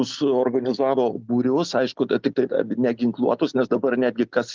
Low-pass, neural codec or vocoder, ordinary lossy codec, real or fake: 7.2 kHz; none; Opus, 32 kbps; real